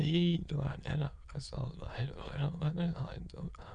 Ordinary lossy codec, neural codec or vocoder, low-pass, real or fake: none; autoencoder, 22.05 kHz, a latent of 192 numbers a frame, VITS, trained on many speakers; 9.9 kHz; fake